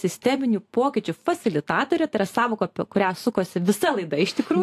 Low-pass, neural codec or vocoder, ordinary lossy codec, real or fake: 14.4 kHz; vocoder, 44.1 kHz, 128 mel bands every 512 samples, BigVGAN v2; AAC, 64 kbps; fake